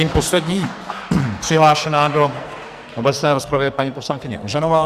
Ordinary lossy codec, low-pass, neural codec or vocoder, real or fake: Opus, 64 kbps; 14.4 kHz; codec, 44.1 kHz, 2.6 kbps, SNAC; fake